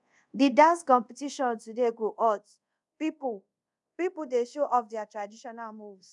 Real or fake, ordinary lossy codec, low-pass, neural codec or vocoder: fake; none; 10.8 kHz; codec, 24 kHz, 0.5 kbps, DualCodec